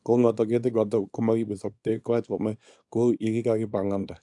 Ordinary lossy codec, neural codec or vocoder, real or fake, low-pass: AAC, 64 kbps; codec, 24 kHz, 0.9 kbps, WavTokenizer, small release; fake; 10.8 kHz